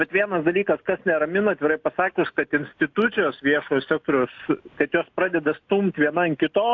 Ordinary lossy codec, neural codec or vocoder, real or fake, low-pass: AAC, 48 kbps; none; real; 7.2 kHz